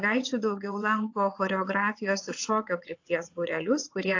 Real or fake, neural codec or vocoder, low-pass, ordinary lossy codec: fake; vocoder, 22.05 kHz, 80 mel bands, WaveNeXt; 7.2 kHz; AAC, 48 kbps